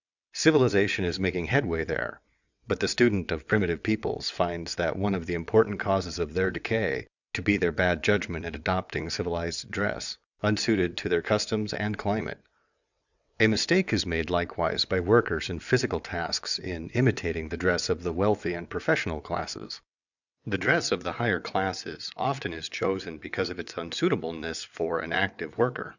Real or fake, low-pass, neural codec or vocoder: fake; 7.2 kHz; vocoder, 22.05 kHz, 80 mel bands, WaveNeXt